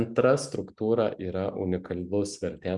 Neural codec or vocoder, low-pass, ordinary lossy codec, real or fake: none; 10.8 kHz; Opus, 64 kbps; real